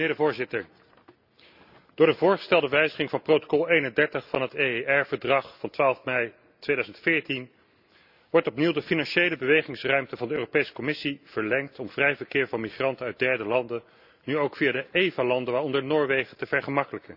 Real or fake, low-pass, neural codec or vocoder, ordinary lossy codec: real; 5.4 kHz; none; none